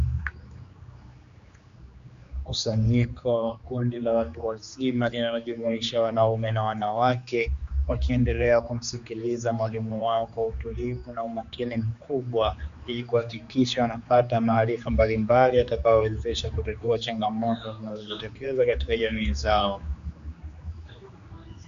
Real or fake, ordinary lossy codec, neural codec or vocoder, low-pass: fake; Opus, 64 kbps; codec, 16 kHz, 2 kbps, X-Codec, HuBERT features, trained on general audio; 7.2 kHz